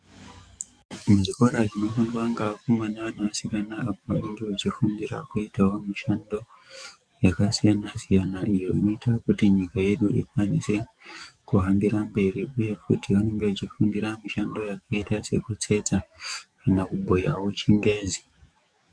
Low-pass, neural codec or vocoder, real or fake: 9.9 kHz; codec, 44.1 kHz, 7.8 kbps, DAC; fake